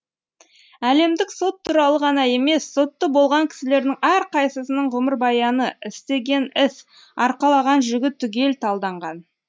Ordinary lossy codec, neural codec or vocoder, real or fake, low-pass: none; none; real; none